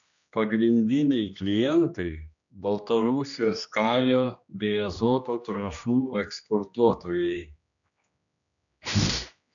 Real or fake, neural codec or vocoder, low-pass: fake; codec, 16 kHz, 1 kbps, X-Codec, HuBERT features, trained on general audio; 7.2 kHz